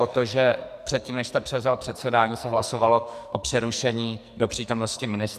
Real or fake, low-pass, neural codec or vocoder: fake; 14.4 kHz; codec, 44.1 kHz, 2.6 kbps, SNAC